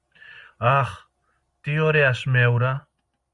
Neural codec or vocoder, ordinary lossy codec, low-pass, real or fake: none; Opus, 64 kbps; 10.8 kHz; real